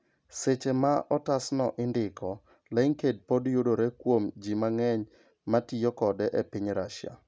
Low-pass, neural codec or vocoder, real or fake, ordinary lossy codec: none; none; real; none